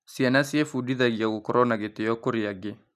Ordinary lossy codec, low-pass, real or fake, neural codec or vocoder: none; 14.4 kHz; real; none